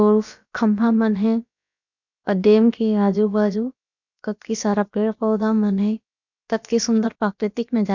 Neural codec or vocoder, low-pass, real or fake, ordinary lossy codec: codec, 16 kHz, about 1 kbps, DyCAST, with the encoder's durations; 7.2 kHz; fake; none